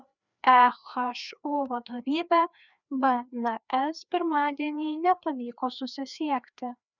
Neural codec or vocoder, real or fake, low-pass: codec, 16 kHz, 2 kbps, FreqCodec, larger model; fake; 7.2 kHz